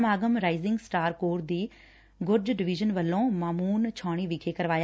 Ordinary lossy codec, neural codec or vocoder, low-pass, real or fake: none; none; none; real